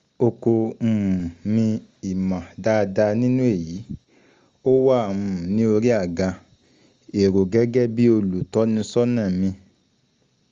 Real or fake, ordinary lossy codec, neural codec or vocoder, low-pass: real; Opus, 32 kbps; none; 7.2 kHz